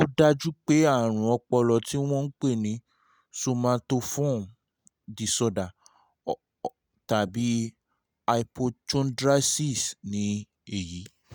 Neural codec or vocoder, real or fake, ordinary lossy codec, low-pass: none; real; none; none